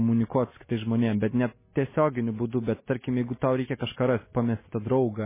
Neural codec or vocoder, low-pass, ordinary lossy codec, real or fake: none; 3.6 kHz; MP3, 16 kbps; real